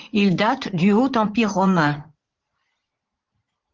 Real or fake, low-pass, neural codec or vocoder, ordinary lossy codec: fake; 7.2 kHz; vocoder, 44.1 kHz, 80 mel bands, Vocos; Opus, 16 kbps